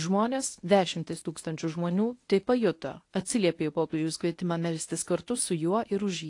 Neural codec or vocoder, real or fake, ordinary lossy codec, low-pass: codec, 24 kHz, 0.9 kbps, WavTokenizer, medium speech release version 1; fake; AAC, 48 kbps; 10.8 kHz